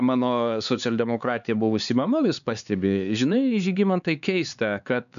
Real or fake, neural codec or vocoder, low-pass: fake; codec, 16 kHz, 4 kbps, X-Codec, HuBERT features, trained on LibriSpeech; 7.2 kHz